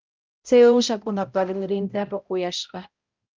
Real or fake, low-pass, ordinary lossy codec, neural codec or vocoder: fake; 7.2 kHz; Opus, 24 kbps; codec, 16 kHz, 0.5 kbps, X-Codec, HuBERT features, trained on balanced general audio